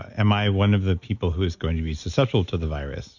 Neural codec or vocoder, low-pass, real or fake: none; 7.2 kHz; real